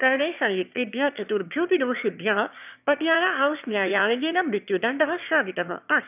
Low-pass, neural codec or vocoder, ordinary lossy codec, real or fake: 3.6 kHz; autoencoder, 22.05 kHz, a latent of 192 numbers a frame, VITS, trained on one speaker; none; fake